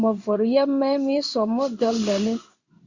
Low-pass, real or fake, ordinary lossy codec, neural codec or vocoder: 7.2 kHz; fake; Opus, 64 kbps; codec, 16 kHz in and 24 kHz out, 1 kbps, XY-Tokenizer